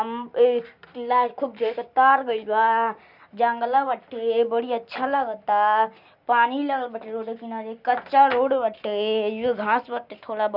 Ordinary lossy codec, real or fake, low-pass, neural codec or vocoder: none; real; 5.4 kHz; none